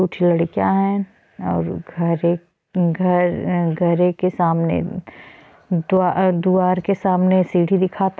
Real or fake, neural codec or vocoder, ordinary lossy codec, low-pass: real; none; none; none